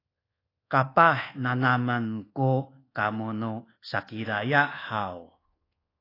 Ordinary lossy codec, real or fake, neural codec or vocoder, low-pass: AAC, 24 kbps; fake; codec, 24 kHz, 1.2 kbps, DualCodec; 5.4 kHz